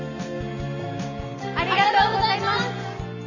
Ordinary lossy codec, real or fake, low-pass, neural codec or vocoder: none; real; 7.2 kHz; none